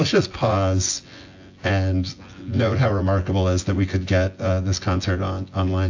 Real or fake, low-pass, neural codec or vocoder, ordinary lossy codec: fake; 7.2 kHz; vocoder, 24 kHz, 100 mel bands, Vocos; AAC, 48 kbps